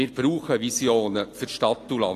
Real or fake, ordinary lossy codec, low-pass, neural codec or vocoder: real; AAC, 48 kbps; 14.4 kHz; none